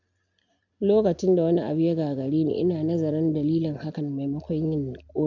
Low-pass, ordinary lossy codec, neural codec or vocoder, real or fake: 7.2 kHz; none; none; real